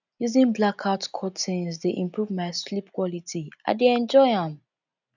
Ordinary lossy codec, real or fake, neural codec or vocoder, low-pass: none; real; none; 7.2 kHz